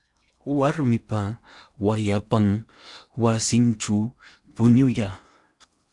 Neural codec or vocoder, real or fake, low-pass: codec, 16 kHz in and 24 kHz out, 0.8 kbps, FocalCodec, streaming, 65536 codes; fake; 10.8 kHz